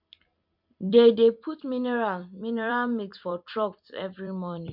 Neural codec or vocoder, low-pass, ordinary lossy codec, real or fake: vocoder, 44.1 kHz, 128 mel bands every 256 samples, BigVGAN v2; 5.4 kHz; MP3, 48 kbps; fake